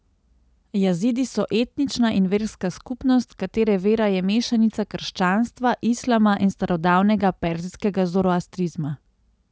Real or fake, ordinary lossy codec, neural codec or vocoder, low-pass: real; none; none; none